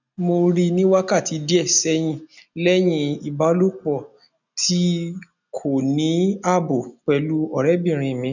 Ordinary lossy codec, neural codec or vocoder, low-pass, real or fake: none; none; 7.2 kHz; real